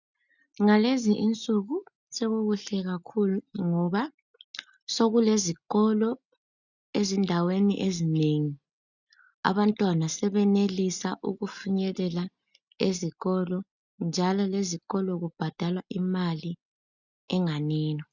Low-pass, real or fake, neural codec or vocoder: 7.2 kHz; real; none